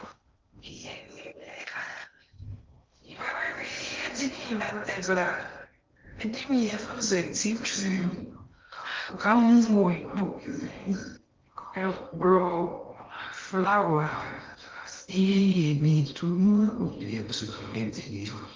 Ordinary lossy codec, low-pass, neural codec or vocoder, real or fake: Opus, 32 kbps; 7.2 kHz; codec, 16 kHz in and 24 kHz out, 0.6 kbps, FocalCodec, streaming, 2048 codes; fake